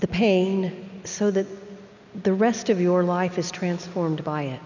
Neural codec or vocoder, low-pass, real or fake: none; 7.2 kHz; real